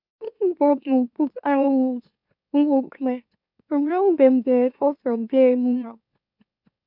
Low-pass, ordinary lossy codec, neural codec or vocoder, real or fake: 5.4 kHz; none; autoencoder, 44.1 kHz, a latent of 192 numbers a frame, MeloTTS; fake